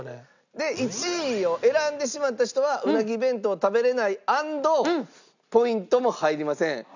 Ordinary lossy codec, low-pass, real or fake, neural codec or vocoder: none; 7.2 kHz; real; none